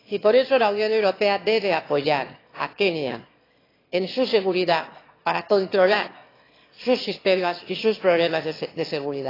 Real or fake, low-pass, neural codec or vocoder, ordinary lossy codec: fake; 5.4 kHz; autoencoder, 22.05 kHz, a latent of 192 numbers a frame, VITS, trained on one speaker; AAC, 24 kbps